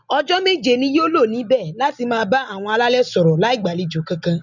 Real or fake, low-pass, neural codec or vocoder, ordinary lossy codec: real; 7.2 kHz; none; none